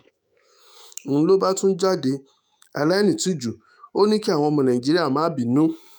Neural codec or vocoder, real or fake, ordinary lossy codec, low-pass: autoencoder, 48 kHz, 128 numbers a frame, DAC-VAE, trained on Japanese speech; fake; none; none